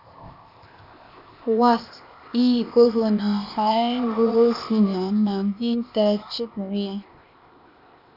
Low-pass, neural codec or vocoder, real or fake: 5.4 kHz; codec, 16 kHz, 0.8 kbps, ZipCodec; fake